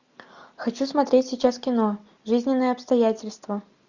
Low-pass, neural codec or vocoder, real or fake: 7.2 kHz; none; real